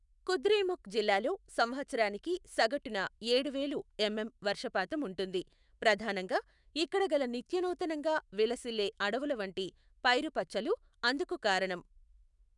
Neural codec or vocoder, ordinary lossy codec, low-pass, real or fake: autoencoder, 48 kHz, 128 numbers a frame, DAC-VAE, trained on Japanese speech; none; 10.8 kHz; fake